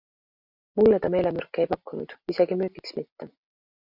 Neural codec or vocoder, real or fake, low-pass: none; real; 5.4 kHz